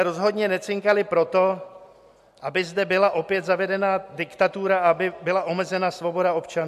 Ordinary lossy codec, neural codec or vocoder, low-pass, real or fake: MP3, 64 kbps; none; 14.4 kHz; real